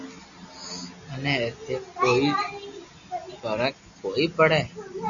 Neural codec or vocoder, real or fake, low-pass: none; real; 7.2 kHz